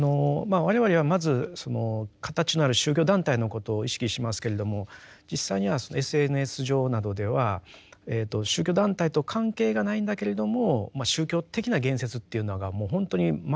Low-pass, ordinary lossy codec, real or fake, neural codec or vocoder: none; none; real; none